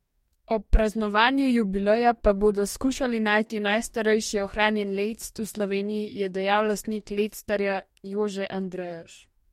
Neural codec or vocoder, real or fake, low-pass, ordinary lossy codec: codec, 44.1 kHz, 2.6 kbps, DAC; fake; 19.8 kHz; MP3, 64 kbps